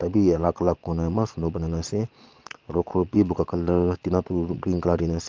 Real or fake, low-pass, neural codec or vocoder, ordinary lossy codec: fake; 7.2 kHz; vocoder, 22.05 kHz, 80 mel bands, Vocos; Opus, 24 kbps